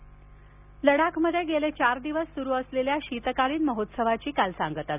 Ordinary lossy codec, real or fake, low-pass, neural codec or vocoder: none; real; 3.6 kHz; none